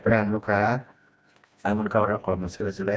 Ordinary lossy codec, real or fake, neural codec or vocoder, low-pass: none; fake; codec, 16 kHz, 1 kbps, FreqCodec, smaller model; none